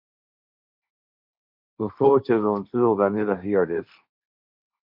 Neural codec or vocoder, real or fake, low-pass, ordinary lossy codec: codec, 16 kHz, 1.1 kbps, Voila-Tokenizer; fake; 5.4 kHz; MP3, 48 kbps